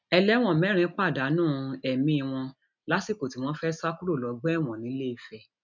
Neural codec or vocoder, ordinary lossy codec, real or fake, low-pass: none; none; real; 7.2 kHz